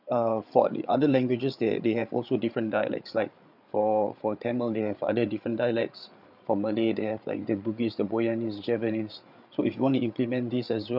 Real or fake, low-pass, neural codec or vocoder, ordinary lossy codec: fake; 5.4 kHz; codec, 16 kHz, 8 kbps, FreqCodec, larger model; none